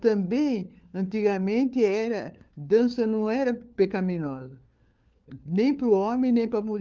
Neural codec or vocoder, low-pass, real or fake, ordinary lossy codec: codec, 16 kHz, 4 kbps, FunCodec, trained on LibriTTS, 50 frames a second; 7.2 kHz; fake; Opus, 24 kbps